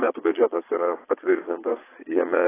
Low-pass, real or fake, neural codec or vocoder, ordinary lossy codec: 3.6 kHz; fake; vocoder, 22.05 kHz, 80 mel bands, Vocos; AAC, 16 kbps